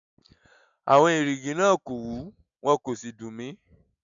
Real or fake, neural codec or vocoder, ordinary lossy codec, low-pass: real; none; none; 7.2 kHz